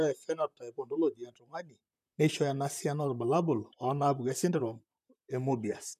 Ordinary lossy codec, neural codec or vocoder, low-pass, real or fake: none; vocoder, 44.1 kHz, 128 mel bands, Pupu-Vocoder; 14.4 kHz; fake